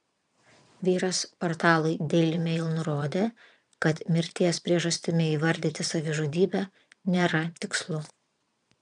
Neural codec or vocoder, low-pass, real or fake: vocoder, 22.05 kHz, 80 mel bands, Vocos; 9.9 kHz; fake